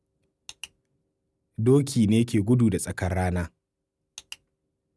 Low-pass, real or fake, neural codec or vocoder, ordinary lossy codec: none; real; none; none